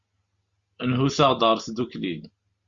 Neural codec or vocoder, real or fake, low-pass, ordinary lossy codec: none; real; 7.2 kHz; Opus, 64 kbps